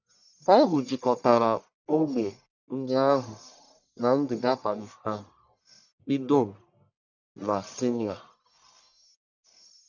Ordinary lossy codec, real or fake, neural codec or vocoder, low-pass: none; fake; codec, 44.1 kHz, 1.7 kbps, Pupu-Codec; 7.2 kHz